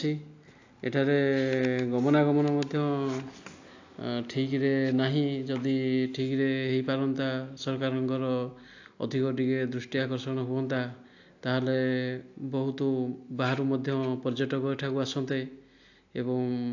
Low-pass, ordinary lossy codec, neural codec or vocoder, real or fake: 7.2 kHz; AAC, 48 kbps; none; real